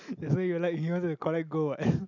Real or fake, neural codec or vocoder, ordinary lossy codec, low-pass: real; none; none; 7.2 kHz